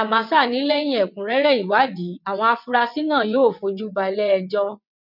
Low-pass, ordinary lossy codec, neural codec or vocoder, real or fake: 5.4 kHz; none; vocoder, 22.05 kHz, 80 mel bands, WaveNeXt; fake